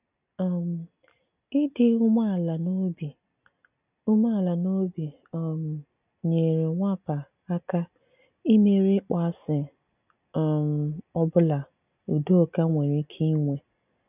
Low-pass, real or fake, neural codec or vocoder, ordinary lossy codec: 3.6 kHz; real; none; none